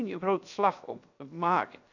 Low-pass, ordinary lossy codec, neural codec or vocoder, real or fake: 7.2 kHz; none; codec, 16 kHz, 0.7 kbps, FocalCodec; fake